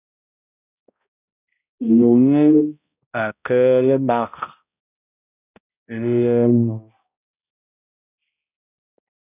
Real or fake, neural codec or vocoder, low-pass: fake; codec, 16 kHz, 0.5 kbps, X-Codec, HuBERT features, trained on balanced general audio; 3.6 kHz